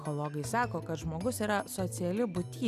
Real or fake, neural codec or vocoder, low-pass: real; none; 14.4 kHz